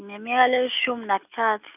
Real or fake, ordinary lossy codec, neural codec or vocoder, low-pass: real; none; none; 3.6 kHz